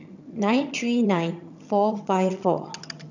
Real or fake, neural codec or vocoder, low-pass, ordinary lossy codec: fake; vocoder, 22.05 kHz, 80 mel bands, HiFi-GAN; 7.2 kHz; none